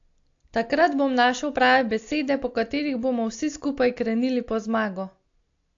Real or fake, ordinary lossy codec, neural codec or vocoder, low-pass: real; AAC, 48 kbps; none; 7.2 kHz